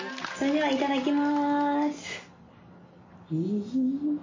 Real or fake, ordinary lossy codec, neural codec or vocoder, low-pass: real; MP3, 32 kbps; none; 7.2 kHz